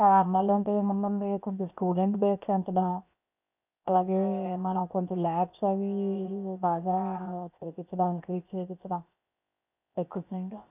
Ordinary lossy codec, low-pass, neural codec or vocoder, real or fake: none; 3.6 kHz; codec, 16 kHz, 0.7 kbps, FocalCodec; fake